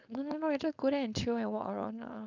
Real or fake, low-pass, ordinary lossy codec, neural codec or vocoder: fake; 7.2 kHz; none; codec, 16 kHz, 4.8 kbps, FACodec